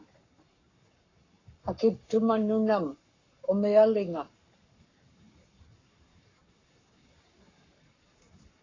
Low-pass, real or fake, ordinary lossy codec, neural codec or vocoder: 7.2 kHz; fake; AAC, 32 kbps; codec, 44.1 kHz, 7.8 kbps, Pupu-Codec